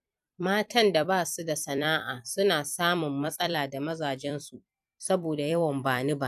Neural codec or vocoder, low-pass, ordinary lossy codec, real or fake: vocoder, 44.1 kHz, 128 mel bands every 256 samples, BigVGAN v2; 14.4 kHz; none; fake